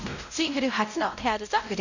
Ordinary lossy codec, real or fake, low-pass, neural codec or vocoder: none; fake; 7.2 kHz; codec, 16 kHz, 0.5 kbps, X-Codec, WavLM features, trained on Multilingual LibriSpeech